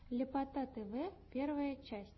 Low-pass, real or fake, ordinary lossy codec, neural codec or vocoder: 7.2 kHz; real; MP3, 24 kbps; none